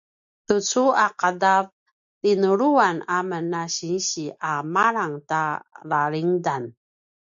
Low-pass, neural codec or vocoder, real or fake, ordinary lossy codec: 7.2 kHz; none; real; AAC, 64 kbps